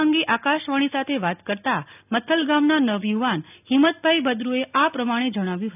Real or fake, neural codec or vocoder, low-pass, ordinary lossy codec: real; none; 3.6 kHz; none